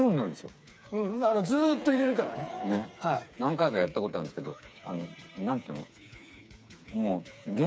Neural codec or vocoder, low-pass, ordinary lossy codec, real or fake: codec, 16 kHz, 4 kbps, FreqCodec, smaller model; none; none; fake